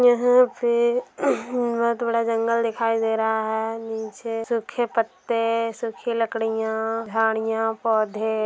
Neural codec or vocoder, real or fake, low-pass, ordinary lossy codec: none; real; none; none